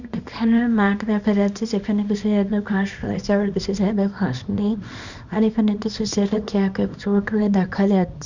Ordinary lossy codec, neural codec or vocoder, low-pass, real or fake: none; codec, 24 kHz, 0.9 kbps, WavTokenizer, small release; 7.2 kHz; fake